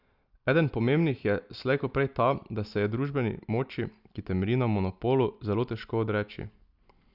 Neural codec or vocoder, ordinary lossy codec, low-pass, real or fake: none; Opus, 64 kbps; 5.4 kHz; real